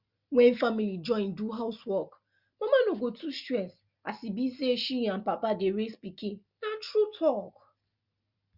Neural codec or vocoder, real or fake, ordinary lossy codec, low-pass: none; real; Opus, 64 kbps; 5.4 kHz